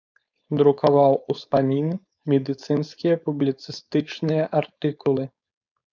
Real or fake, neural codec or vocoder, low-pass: fake; codec, 16 kHz, 4.8 kbps, FACodec; 7.2 kHz